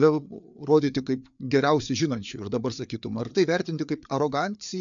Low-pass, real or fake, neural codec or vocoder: 7.2 kHz; fake; codec, 16 kHz, 4 kbps, FreqCodec, larger model